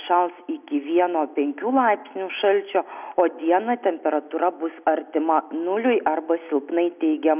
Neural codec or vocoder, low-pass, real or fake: none; 3.6 kHz; real